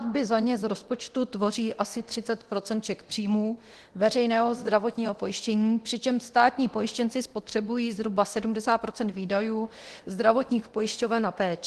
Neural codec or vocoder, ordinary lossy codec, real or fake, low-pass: codec, 24 kHz, 0.9 kbps, DualCodec; Opus, 16 kbps; fake; 10.8 kHz